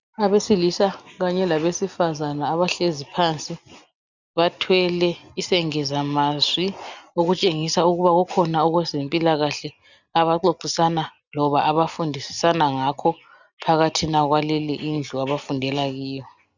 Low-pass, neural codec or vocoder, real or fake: 7.2 kHz; none; real